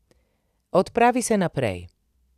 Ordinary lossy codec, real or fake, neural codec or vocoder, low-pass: none; real; none; 14.4 kHz